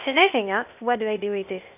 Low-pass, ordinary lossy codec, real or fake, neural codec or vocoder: 3.6 kHz; none; fake; codec, 16 kHz, 0.8 kbps, ZipCodec